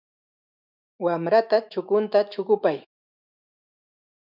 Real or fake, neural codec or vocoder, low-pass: fake; autoencoder, 48 kHz, 128 numbers a frame, DAC-VAE, trained on Japanese speech; 5.4 kHz